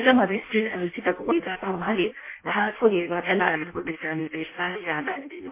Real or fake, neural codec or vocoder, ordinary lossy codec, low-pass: fake; codec, 16 kHz in and 24 kHz out, 0.6 kbps, FireRedTTS-2 codec; MP3, 24 kbps; 3.6 kHz